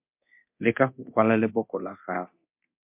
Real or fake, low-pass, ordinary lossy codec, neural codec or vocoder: fake; 3.6 kHz; MP3, 32 kbps; codec, 24 kHz, 0.9 kbps, DualCodec